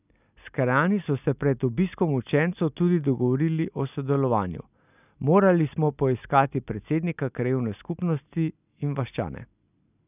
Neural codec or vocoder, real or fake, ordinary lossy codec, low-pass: none; real; none; 3.6 kHz